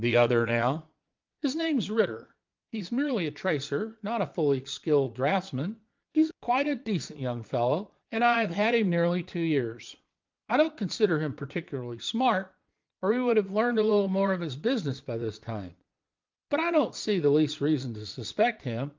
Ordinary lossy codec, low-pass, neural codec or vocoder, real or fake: Opus, 24 kbps; 7.2 kHz; vocoder, 22.05 kHz, 80 mel bands, Vocos; fake